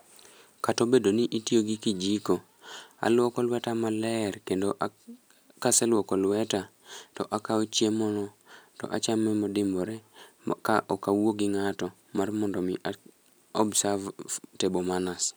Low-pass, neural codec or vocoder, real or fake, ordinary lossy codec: none; none; real; none